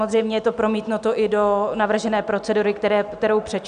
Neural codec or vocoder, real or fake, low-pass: none; real; 9.9 kHz